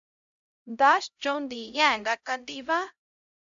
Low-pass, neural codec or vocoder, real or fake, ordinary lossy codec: 7.2 kHz; codec, 16 kHz, 0.5 kbps, X-Codec, HuBERT features, trained on LibriSpeech; fake; AAC, 64 kbps